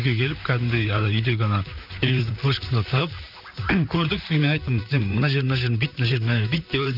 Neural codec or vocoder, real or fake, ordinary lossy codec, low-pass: vocoder, 44.1 kHz, 128 mel bands, Pupu-Vocoder; fake; none; 5.4 kHz